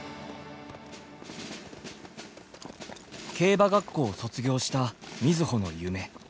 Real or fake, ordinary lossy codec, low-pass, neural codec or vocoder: real; none; none; none